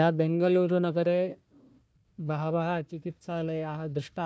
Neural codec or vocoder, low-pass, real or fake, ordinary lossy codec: codec, 16 kHz, 1 kbps, FunCodec, trained on Chinese and English, 50 frames a second; none; fake; none